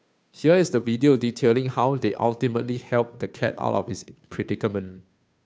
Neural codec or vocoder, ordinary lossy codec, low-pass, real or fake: codec, 16 kHz, 2 kbps, FunCodec, trained on Chinese and English, 25 frames a second; none; none; fake